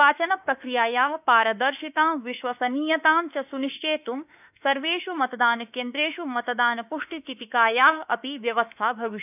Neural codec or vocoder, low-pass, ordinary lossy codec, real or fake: autoencoder, 48 kHz, 32 numbers a frame, DAC-VAE, trained on Japanese speech; 3.6 kHz; none; fake